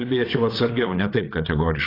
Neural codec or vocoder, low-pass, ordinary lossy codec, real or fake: vocoder, 22.05 kHz, 80 mel bands, WaveNeXt; 5.4 kHz; AAC, 24 kbps; fake